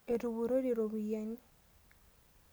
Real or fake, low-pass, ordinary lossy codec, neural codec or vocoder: real; none; none; none